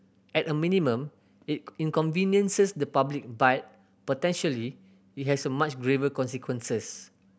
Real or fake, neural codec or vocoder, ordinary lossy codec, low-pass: real; none; none; none